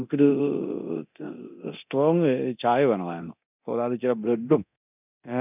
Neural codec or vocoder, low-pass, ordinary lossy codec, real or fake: codec, 24 kHz, 0.9 kbps, DualCodec; 3.6 kHz; none; fake